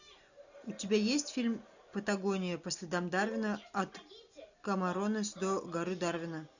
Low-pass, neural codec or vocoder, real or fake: 7.2 kHz; none; real